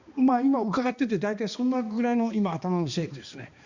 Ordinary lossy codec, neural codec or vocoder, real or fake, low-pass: Opus, 64 kbps; codec, 16 kHz, 2 kbps, X-Codec, HuBERT features, trained on balanced general audio; fake; 7.2 kHz